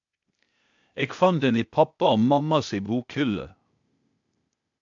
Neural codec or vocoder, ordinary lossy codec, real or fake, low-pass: codec, 16 kHz, 0.8 kbps, ZipCodec; MP3, 64 kbps; fake; 7.2 kHz